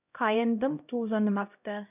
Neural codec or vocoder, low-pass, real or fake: codec, 16 kHz, 0.5 kbps, X-Codec, HuBERT features, trained on LibriSpeech; 3.6 kHz; fake